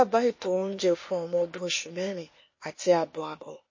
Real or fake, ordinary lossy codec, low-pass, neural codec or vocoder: fake; MP3, 32 kbps; 7.2 kHz; codec, 16 kHz, 0.8 kbps, ZipCodec